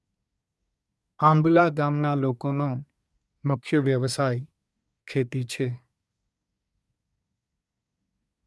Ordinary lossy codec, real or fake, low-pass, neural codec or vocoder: none; fake; none; codec, 24 kHz, 1 kbps, SNAC